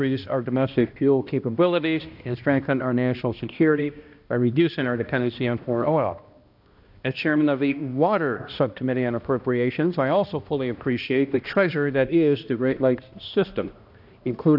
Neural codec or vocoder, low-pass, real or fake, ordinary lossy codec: codec, 16 kHz, 1 kbps, X-Codec, HuBERT features, trained on balanced general audio; 5.4 kHz; fake; AAC, 48 kbps